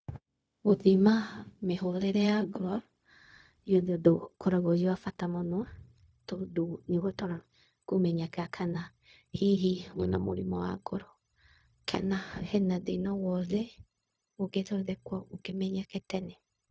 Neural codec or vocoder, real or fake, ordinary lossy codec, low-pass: codec, 16 kHz, 0.4 kbps, LongCat-Audio-Codec; fake; none; none